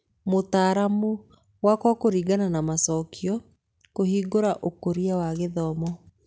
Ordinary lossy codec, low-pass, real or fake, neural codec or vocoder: none; none; real; none